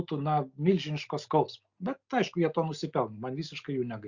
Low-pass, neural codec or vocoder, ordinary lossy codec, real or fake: 7.2 kHz; none; Opus, 64 kbps; real